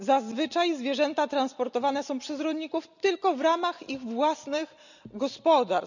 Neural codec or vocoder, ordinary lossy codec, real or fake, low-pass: none; none; real; 7.2 kHz